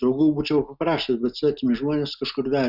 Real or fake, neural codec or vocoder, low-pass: real; none; 5.4 kHz